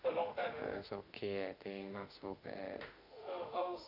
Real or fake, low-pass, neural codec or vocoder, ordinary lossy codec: fake; 5.4 kHz; autoencoder, 48 kHz, 32 numbers a frame, DAC-VAE, trained on Japanese speech; none